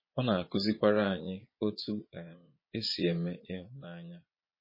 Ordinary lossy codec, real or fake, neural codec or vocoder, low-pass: MP3, 24 kbps; fake; vocoder, 22.05 kHz, 80 mel bands, Vocos; 5.4 kHz